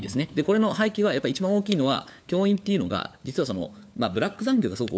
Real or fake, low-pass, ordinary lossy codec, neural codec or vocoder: fake; none; none; codec, 16 kHz, 4 kbps, FunCodec, trained on LibriTTS, 50 frames a second